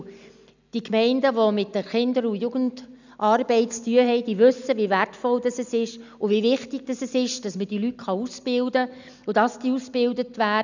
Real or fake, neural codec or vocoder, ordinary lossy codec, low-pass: real; none; none; 7.2 kHz